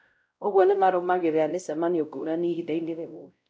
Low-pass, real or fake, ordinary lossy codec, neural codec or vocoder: none; fake; none; codec, 16 kHz, 0.5 kbps, X-Codec, WavLM features, trained on Multilingual LibriSpeech